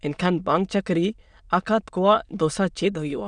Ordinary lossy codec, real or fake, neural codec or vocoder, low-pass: none; fake; autoencoder, 22.05 kHz, a latent of 192 numbers a frame, VITS, trained on many speakers; 9.9 kHz